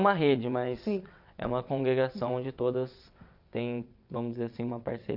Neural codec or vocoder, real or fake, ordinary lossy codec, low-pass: vocoder, 22.05 kHz, 80 mel bands, WaveNeXt; fake; none; 5.4 kHz